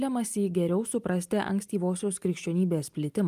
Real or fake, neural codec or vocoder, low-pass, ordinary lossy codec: real; none; 14.4 kHz; Opus, 32 kbps